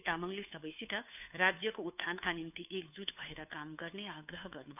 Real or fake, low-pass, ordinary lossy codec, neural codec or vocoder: fake; 3.6 kHz; none; codec, 16 kHz, 2 kbps, FunCodec, trained on Chinese and English, 25 frames a second